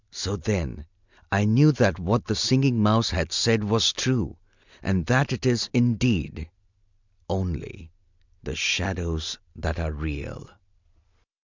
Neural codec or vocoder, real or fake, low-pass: none; real; 7.2 kHz